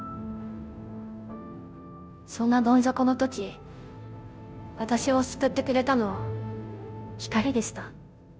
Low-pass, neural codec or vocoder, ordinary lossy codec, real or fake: none; codec, 16 kHz, 0.5 kbps, FunCodec, trained on Chinese and English, 25 frames a second; none; fake